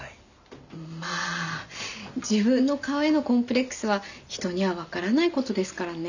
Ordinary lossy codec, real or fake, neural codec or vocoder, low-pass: none; real; none; 7.2 kHz